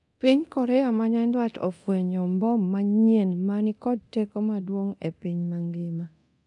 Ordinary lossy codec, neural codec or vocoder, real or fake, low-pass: none; codec, 24 kHz, 0.9 kbps, DualCodec; fake; 10.8 kHz